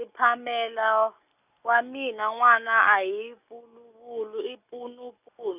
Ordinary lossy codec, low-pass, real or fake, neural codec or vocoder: none; 3.6 kHz; real; none